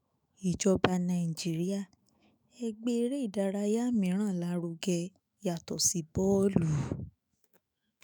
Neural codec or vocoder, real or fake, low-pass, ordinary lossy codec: autoencoder, 48 kHz, 128 numbers a frame, DAC-VAE, trained on Japanese speech; fake; none; none